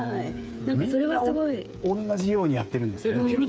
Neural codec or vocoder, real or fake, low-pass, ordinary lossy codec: codec, 16 kHz, 8 kbps, FreqCodec, smaller model; fake; none; none